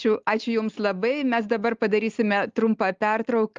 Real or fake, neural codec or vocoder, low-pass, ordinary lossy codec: real; none; 7.2 kHz; Opus, 32 kbps